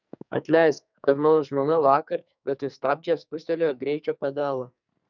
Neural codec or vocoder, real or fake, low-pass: codec, 44.1 kHz, 2.6 kbps, SNAC; fake; 7.2 kHz